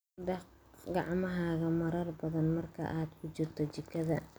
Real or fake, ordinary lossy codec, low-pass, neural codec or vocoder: real; none; none; none